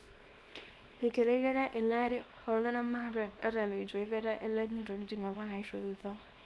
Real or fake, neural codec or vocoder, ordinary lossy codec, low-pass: fake; codec, 24 kHz, 0.9 kbps, WavTokenizer, small release; none; none